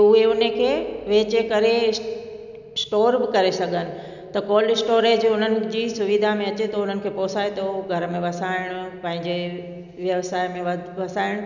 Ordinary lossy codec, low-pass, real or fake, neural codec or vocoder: none; 7.2 kHz; real; none